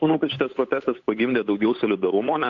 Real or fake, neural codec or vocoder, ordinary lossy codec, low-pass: fake; codec, 16 kHz, 8 kbps, FunCodec, trained on Chinese and English, 25 frames a second; AAC, 48 kbps; 7.2 kHz